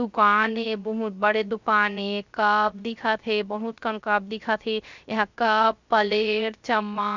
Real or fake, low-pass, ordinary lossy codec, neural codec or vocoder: fake; 7.2 kHz; Opus, 64 kbps; codec, 16 kHz, 0.3 kbps, FocalCodec